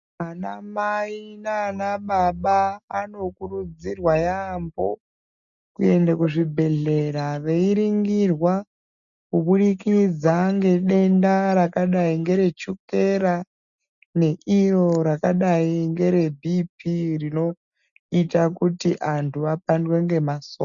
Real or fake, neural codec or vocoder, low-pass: real; none; 7.2 kHz